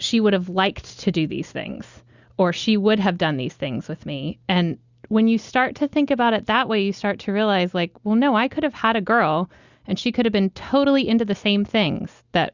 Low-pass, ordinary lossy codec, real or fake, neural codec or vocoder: 7.2 kHz; Opus, 64 kbps; real; none